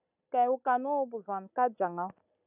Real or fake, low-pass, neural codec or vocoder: real; 3.6 kHz; none